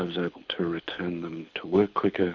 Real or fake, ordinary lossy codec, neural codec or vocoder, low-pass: fake; MP3, 64 kbps; codec, 24 kHz, 6 kbps, HILCodec; 7.2 kHz